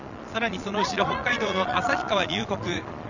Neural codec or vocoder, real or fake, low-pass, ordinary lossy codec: vocoder, 22.05 kHz, 80 mel bands, Vocos; fake; 7.2 kHz; none